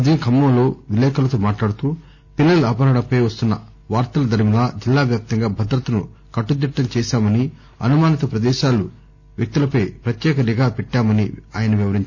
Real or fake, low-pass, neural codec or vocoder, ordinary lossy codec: real; none; none; none